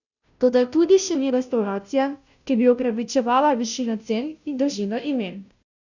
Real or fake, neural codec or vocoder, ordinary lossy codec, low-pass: fake; codec, 16 kHz, 0.5 kbps, FunCodec, trained on Chinese and English, 25 frames a second; none; 7.2 kHz